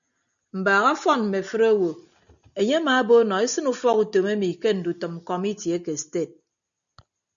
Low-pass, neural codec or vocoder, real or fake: 7.2 kHz; none; real